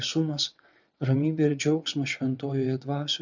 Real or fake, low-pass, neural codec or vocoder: fake; 7.2 kHz; vocoder, 22.05 kHz, 80 mel bands, WaveNeXt